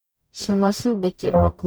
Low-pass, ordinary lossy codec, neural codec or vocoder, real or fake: none; none; codec, 44.1 kHz, 0.9 kbps, DAC; fake